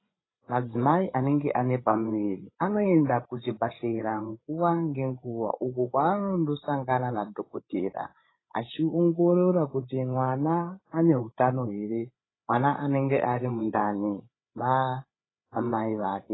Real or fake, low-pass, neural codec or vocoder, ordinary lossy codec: fake; 7.2 kHz; codec, 16 kHz, 8 kbps, FreqCodec, larger model; AAC, 16 kbps